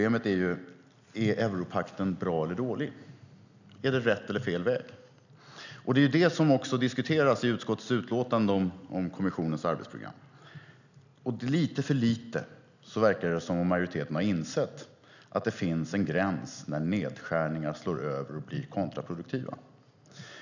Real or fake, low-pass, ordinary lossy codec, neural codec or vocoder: real; 7.2 kHz; none; none